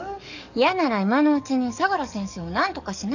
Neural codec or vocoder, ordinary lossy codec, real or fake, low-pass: codec, 44.1 kHz, 7.8 kbps, DAC; none; fake; 7.2 kHz